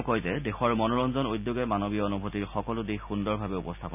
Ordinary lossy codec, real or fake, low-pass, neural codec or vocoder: none; real; 3.6 kHz; none